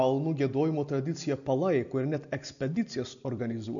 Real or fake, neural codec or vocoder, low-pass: real; none; 7.2 kHz